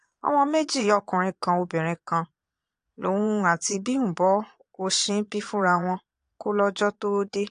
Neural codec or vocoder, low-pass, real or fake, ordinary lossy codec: vocoder, 22.05 kHz, 80 mel bands, Vocos; 9.9 kHz; fake; AAC, 64 kbps